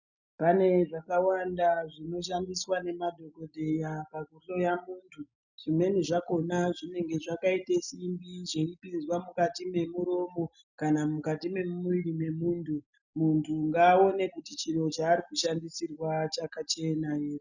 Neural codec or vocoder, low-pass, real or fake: none; 7.2 kHz; real